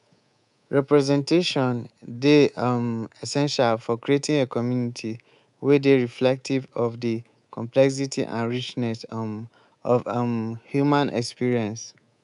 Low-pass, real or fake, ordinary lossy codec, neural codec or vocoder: 10.8 kHz; fake; none; codec, 24 kHz, 3.1 kbps, DualCodec